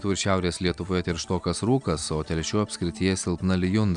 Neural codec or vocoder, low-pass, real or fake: none; 9.9 kHz; real